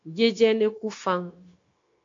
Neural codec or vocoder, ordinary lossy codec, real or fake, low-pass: codec, 16 kHz, 0.9 kbps, LongCat-Audio-Codec; AAC, 48 kbps; fake; 7.2 kHz